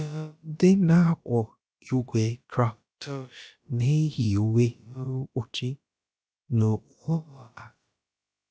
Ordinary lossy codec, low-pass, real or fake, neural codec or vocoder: none; none; fake; codec, 16 kHz, about 1 kbps, DyCAST, with the encoder's durations